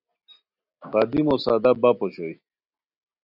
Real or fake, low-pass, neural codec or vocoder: real; 5.4 kHz; none